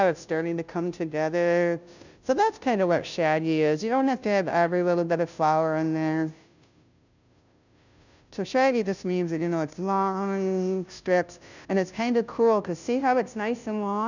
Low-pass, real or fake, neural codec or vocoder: 7.2 kHz; fake; codec, 16 kHz, 0.5 kbps, FunCodec, trained on Chinese and English, 25 frames a second